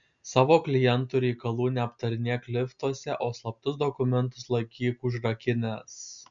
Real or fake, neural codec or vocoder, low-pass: real; none; 7.2 kHz